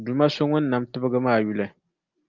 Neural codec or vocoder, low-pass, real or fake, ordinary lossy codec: none; 7.2 kHz; real; Opus, 24 kbps